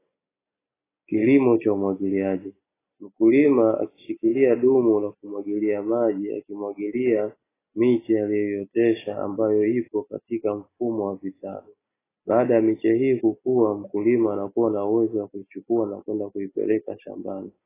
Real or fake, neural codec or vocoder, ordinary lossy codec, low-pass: real; none; AAC, 16 kbps; 3.6 kHz